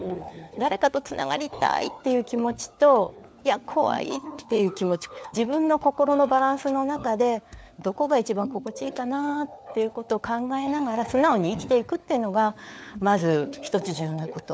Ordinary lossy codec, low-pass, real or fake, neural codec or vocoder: none; none; fake; codec, 16 kHz, 4 kbps, FunCodec, trained on LibriTTS, 50 frames a second